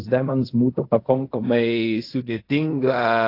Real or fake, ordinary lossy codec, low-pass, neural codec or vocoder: fake; AAC, 32 kbps; 5.4 kHz; codec, 16 kHz in and 24 kHz out, 0.4 kbps, LongCat-Audio-Codec, fine tuned four codebook decoder